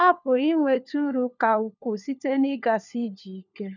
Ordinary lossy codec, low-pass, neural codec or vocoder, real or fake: none; 7.2 kHz; codec, 16 kHz, 4 kbps, FunCodec, trained on LibriTTS, 50 frames a second; fake